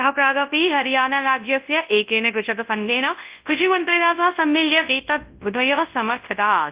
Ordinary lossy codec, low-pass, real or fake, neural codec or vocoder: Opus, 32 kbps; 3.6 kHz; fake; codec, 24 kHz, 0.9 kbps, WavTokenizer, large speech release